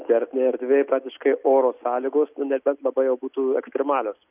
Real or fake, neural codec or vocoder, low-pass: real; none; 3.6 kHz